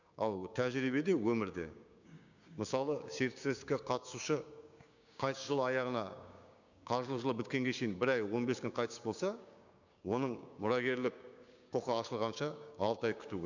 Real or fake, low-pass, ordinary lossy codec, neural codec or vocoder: fake; 7.2 kHz; none; codec, 16 kHz, 6 kbps, DAC